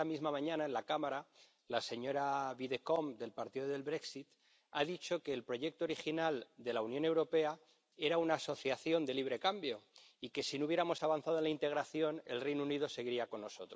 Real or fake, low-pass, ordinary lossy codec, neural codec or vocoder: real; none; none; none